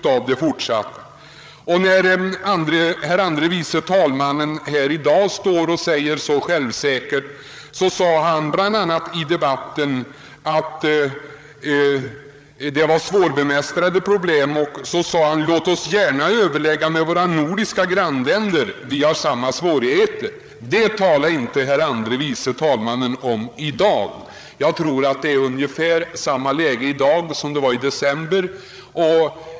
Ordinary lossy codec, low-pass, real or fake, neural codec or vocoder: none; none; fake; codec, 16 kHz, 8 kbps, FreqCodec, larger model